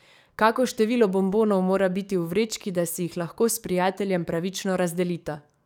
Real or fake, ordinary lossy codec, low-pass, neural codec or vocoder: fake; none; 19.8 kHz; codec, 44.1 kHz, 7.8 kbps, DAC